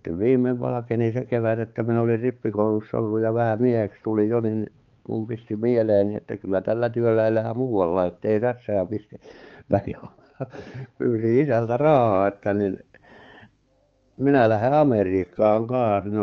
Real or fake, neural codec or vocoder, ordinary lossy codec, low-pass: fake; codec, 16 kHz, 4 kbps, X-Codec, HuBERT features, trained on balanced general audio; Opus, 24 kbps; 7.2 kHz